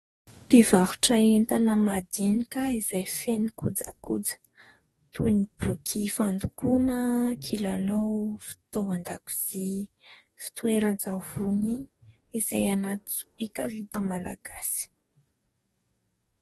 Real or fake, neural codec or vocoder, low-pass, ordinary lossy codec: fake; codec, 44.1 kHz, 2.6 kbps, DAC; 19.8 kHz; AAC, 32 kbps